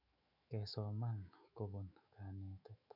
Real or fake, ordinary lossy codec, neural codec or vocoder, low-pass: fake; none; autoencoder, 48 kHz, 128 numbers a frame, DAC-VAE, trained on Japanese speech; 5.4 kHz